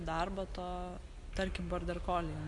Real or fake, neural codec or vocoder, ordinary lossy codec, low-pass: real; none; MP3, 64 kbps; 10.8 kHz